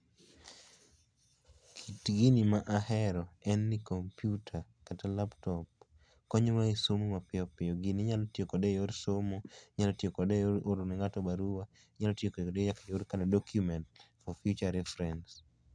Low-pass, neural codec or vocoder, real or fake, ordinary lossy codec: 9.9 kHz; none; real; none